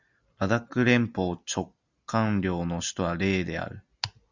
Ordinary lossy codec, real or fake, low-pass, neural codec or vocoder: Opus, 64 kbps; real; 7.2 kHz; none